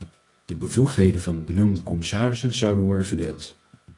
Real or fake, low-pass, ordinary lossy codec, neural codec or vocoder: fake; 10.8 kHz; AAC, 48 kbps; codec, 24 kHz, 0.9 kbps, WavTokenizer, medium music audio release